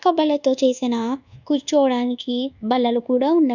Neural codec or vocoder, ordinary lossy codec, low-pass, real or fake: codec, 24 kHz, 1.2 kbps, DualCodec; none; 7.2 kHz; fake